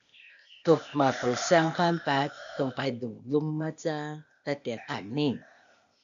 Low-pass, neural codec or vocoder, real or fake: 7.2 kHz; codec, 16 kHz, 0.8 kbps, ZipCodec; fake